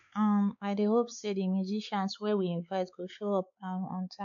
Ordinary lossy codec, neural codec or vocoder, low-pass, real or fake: none; codec, 16 kHz, 4 kbps, X-Codec, WavLM features, trained on Multilingual LibriSpeech; 7.2 kHz; fake